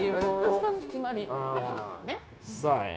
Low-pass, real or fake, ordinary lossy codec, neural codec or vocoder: none; fake; none; codec, 16 kHz, 0.9 kbps, LongCat-Audio-Codec